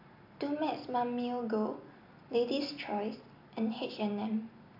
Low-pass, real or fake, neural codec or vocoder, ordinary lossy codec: 5.4 kHz; real; none; AAC, 48 kbps